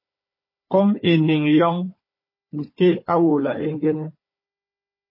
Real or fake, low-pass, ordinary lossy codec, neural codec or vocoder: fake; 5.4 kHz; MP3, 24 kbps; codec, 16 kHz, 4 kbps, FunCodec, trained on Chinese and English, 50 frames a second